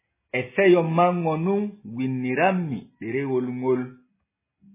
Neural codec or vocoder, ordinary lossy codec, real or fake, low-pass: none; MP3, 16 kbps; real; 3.6 kHz